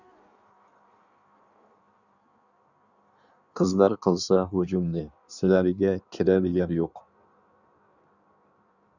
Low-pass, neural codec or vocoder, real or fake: 7.2 kHz; codec, 16 kHz in and 24 kHz out, 1.1 kbps, FireRedTTS-2 codec; fake